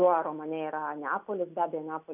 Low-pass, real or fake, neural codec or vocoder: 3.6 kHz; real; none